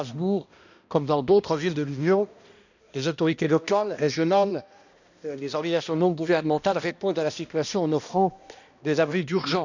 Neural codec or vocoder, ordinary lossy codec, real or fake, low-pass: codec, 16 kHz, 1 kbps, X-Codec, HuBERT features, trained on balanced general audio; none; fake; 7.2 kHz